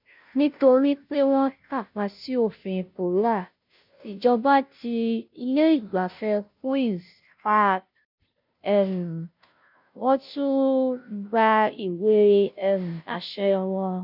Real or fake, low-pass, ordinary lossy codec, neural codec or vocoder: fake; 5.4 kHz; none; codec, 16 kHz, 0.5 kbps, FunCodec, trained on Chinese and English, 25 frames a second